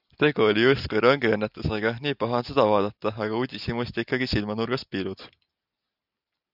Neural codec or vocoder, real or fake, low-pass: none; real; 5.4 kHz